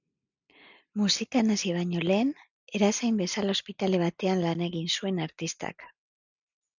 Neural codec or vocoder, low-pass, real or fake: none; 7.2 kHz; real